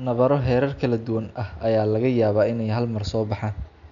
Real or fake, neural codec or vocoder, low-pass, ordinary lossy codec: real; none; 7.2 kHz; none